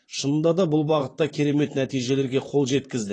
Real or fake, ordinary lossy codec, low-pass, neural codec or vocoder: fake; AAC, 32 kbps; 9.9 kHz; vocoder, 44.1 kHz, 128 mel bands, Pupu-Vocoder